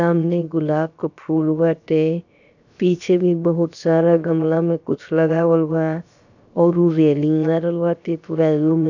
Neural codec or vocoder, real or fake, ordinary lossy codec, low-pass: codec, 16 kHz, about 1 kbps, DyCAST, with the encoder's durations; fake; none; 7.2 kHz